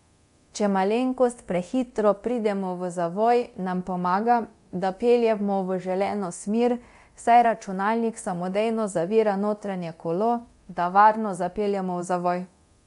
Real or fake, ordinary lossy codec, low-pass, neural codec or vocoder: fake; MP3, 64 kbps; 10.8 kHz; codec, 24 kHz, 0.9 kbps, DualCodec